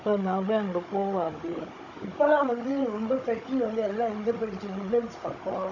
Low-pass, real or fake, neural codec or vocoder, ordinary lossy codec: 7.2 kHz; fake; codec, 16 kHz, 16 kbps, FunCodec, trained on Chinese and English, 50 frames a second; none